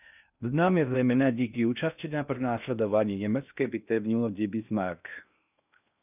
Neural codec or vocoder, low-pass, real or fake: codec, 16 kHz in and 24 kHz out, 0.6 kbps, FocalCodec, streaming, 2048 codes; 3.6 kHz; fake